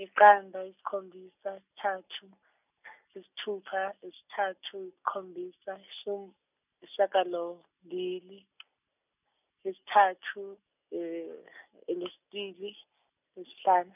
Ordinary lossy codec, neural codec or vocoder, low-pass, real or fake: none; none; 3.6 kHz; real